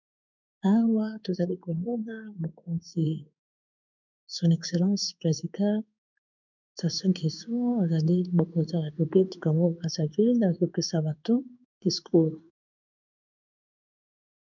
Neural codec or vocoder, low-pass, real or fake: codec, 16 kHz in and 24 kHz out, 1 kbps, XY-Tokenizer; 7.2 kHz; fake